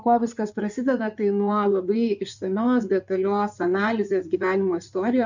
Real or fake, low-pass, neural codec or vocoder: fake; 7.2 kHz; codec, 16 kHz in and 24 kHz out, 2.2 kbps, FireRedTTS-2 codec